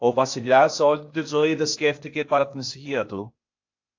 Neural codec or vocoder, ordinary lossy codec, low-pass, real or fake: codec, 16 kHz, 0.8 kbps, ZipCodec; AAC, 48 kbps; 7.2 kHz; fake